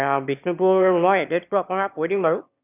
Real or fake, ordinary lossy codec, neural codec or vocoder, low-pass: fake; none; autoencoder, 22.05 kHz, a latent of 192 numbers a frame, VITS, trained on one speaker; 3.6 kHz